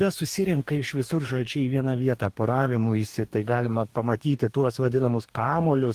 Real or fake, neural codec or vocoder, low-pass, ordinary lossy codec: fake; codec, 44.1 kHz, 2.6 kbps, DAC; 14.4 kHz; Opus, 24 kbps